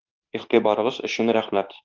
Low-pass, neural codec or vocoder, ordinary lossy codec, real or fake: 7.2 kHz; codec, 24 kHz, 0.9 kbps, WavTokenizer, medium speech release version 2; Opus, 32 kbps; fake